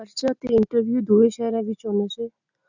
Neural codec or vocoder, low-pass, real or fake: none; 7.2 kHz; real